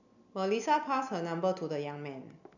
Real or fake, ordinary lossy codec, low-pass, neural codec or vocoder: real; none; 7.2 kHz; none